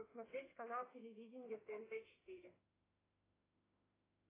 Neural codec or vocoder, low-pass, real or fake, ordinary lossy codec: autoencoder, 48 kHz, 32 numbers a frame, DAC-VAE, trained on Japanese speech; 3.6 kHz; fake; AAC, 16 kbps